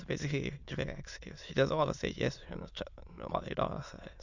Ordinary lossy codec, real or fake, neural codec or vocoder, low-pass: Opus, 64 kbps; fake; autoencoder, 22.05 kHz, a latent of 192 numbers a frame, VITS, trained on many speakers; 7.2 kHz